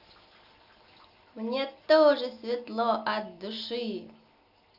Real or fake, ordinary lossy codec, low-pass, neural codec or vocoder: real; none; 5.4 kHz; none